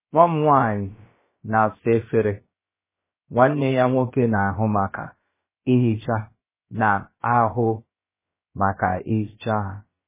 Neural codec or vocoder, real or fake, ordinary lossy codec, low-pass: codec, 16 kHz, about 1 kbps, DyCAST, with the encoder's durations; fake; MP3, 16 kbps; 3.6 kHz